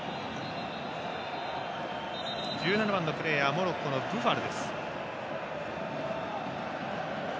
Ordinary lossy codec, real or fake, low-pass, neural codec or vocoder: none; real; none; none